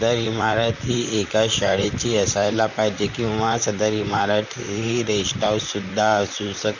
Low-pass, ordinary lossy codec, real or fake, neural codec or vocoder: 7.2 kHz; none; fake; vocoder, 44.1 kHz, 128 mel bands, Pupu-Vocoder